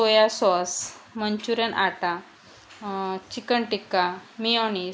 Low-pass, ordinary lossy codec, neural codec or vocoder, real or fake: none; none; none; real